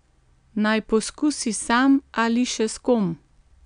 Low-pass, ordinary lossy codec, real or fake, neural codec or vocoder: 9.9 kHz; none; real; none